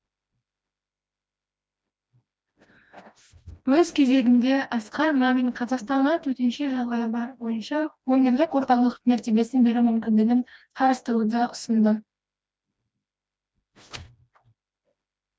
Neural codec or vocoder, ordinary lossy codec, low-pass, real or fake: codec, 16 kHz, 1 kbps, FreqCodec, smaller model; none; none; fake